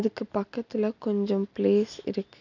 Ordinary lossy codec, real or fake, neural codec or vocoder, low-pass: none; real; none; 7.2 kHz